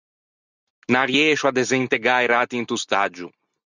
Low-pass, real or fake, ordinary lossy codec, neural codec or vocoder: 7.2 kHz; real; Opus, 64 kbps; none